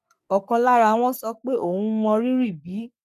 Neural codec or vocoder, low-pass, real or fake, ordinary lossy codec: codec, 44.1 kHz, 3.4 kbps, Pupu-Codec; 14.4 kHz; fake; none